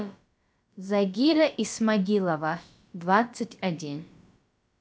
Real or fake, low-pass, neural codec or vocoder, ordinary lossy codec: fake; none; codec, 16 kHz, about 1 kbps, DyCAST, with the encoder's durations; none